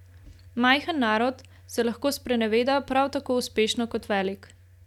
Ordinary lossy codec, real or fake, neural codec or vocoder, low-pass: none; real; none; 19.8 kHz